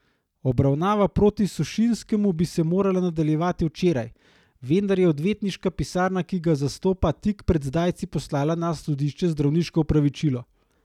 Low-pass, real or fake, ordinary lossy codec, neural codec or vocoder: 19.8 kHz; real; none; none